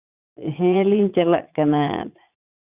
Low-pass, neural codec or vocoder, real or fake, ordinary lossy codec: 3.6 kHz; vocoder, 44.1 kHz, 80 mel bands, Vocos; fake; Opus, 64 kbps